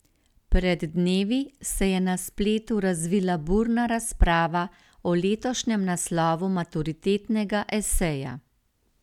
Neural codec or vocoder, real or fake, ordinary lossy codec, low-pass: none; real; none; 19.8 kHz